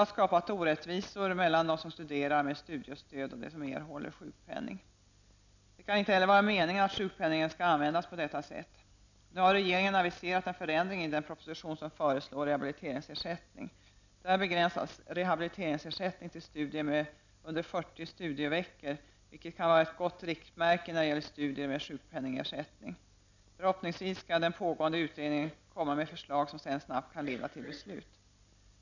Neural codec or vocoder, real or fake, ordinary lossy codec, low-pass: none; real; none; 7.2 kHz